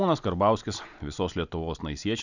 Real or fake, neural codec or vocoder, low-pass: real; none; 7.2 kHz